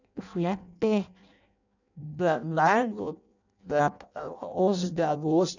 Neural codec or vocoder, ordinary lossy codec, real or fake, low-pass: codec, 16 kHz in and 24 kHz out, 0.6 kbps, FireRedTTS-2 codec; none; fake; 7.2 kHz